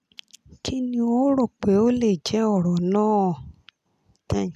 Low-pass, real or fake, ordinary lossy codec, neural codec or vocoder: 14.4 kHz; real; none; none